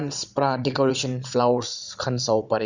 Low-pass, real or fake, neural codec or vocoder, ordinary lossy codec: 7.2 kHz; fake; vocoder, 22.05 kHz, 80 mel bands, Vocos; Opus, 64 kbps